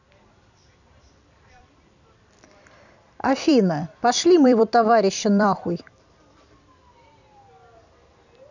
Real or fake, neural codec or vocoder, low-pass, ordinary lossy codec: fake; vocoder, 44.1 kHz, 128 mel bands every 256 samples, BigVGAN v2; 7.2 kHz; none